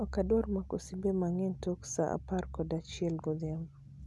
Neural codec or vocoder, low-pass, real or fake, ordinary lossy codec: none; none; real; none